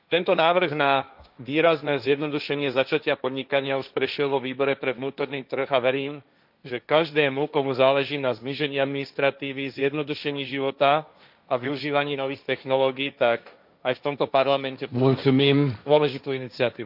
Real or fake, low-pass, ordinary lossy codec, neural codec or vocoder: fake; 5.4 kHz; none; codec, 16 kHz, 1.1 kbps, Voila-Tokenizer